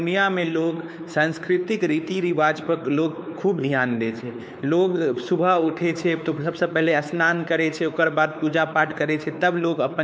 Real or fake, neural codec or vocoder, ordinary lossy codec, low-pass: fake; codec, 16 kHz, 4 kbps, X-Codec, WavLM features, trained on Multilingual LibriSpeech; none; none